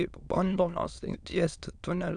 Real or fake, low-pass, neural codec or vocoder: fake; 9.9 kHz; autoencoder, 22.05 kHz, a latent of 192 numbers a frame, VITS, trained on many speakers